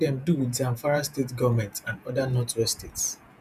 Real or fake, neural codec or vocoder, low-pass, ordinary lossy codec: fake; vocoder, 48 kHz, 128 mel bands, Vocos; 14.4 kHz; none